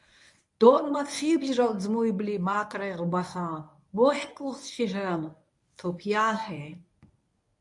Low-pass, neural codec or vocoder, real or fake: 10.8 kHz; codec, 24 kHz, 0.9 kbps, WavTokenizer, medium speech release version 1; fake